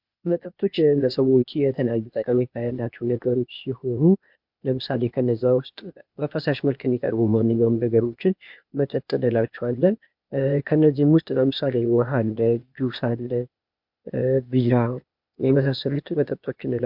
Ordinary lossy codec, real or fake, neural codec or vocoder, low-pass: MP3, 48 kbps; fake; codec, 16 kHz, 0.8 kbps, ZipCodec; 5.4 kHz